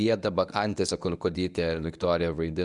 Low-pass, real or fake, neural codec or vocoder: 10.8 kHz; fake; codec, 24 kHz, 0.9 kbps, WavTokenizer, medium speech release version 1